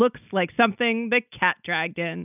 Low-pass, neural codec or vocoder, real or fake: 3.6 kHz; none; real